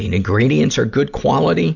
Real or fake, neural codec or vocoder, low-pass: real; none; 7.2 kHz